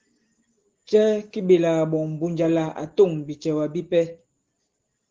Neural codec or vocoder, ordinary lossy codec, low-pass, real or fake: none; Opus, 16 kbps; 7.2 kHz; real